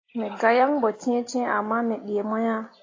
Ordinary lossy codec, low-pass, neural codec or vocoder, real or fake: AAC, 32 kbps; 7.2 kHz; none; real